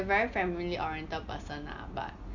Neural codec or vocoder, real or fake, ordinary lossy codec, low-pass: none; real; none; 7.2 kHz